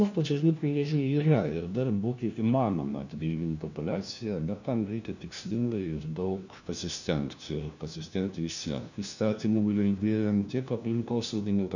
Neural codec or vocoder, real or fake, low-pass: codec, 16 kHz, 1 kbps, FunCodec, trained on LibriTTS, 50 frames a second; fake; 7.2 kHz